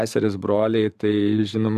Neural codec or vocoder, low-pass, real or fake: vocoder, 44.1 kHz, 128 mel bands every 512 samples, BigVGAN v2; 14.4 kHz; fake